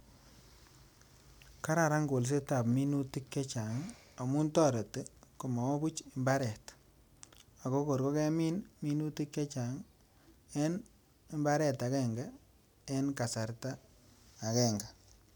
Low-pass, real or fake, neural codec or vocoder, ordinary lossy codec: none; real; none; none